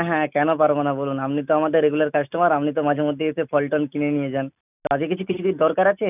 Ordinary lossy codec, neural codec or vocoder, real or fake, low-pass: none; none; real; 3.6 kHz